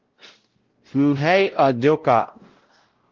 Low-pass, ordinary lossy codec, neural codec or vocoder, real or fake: 7.2 kHz; Opus, 16 kbps; codec, 16 kHz, 0.5 kbps, X-Codec, WavLM features, trained on Multilingual LibriSpeech; fake